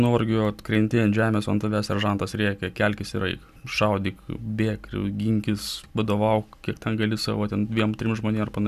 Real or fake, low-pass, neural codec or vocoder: real; 14.4 kHz; none